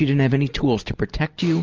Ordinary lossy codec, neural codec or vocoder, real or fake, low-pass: Opus, 24 kbps; none; real; 7.2 kHz